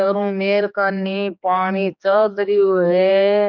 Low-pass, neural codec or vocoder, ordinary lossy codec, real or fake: 7.2 kHz; codec, 16 kHz, 2 kbps, X-Codec, HuBERT features, trained on general audio; none; fake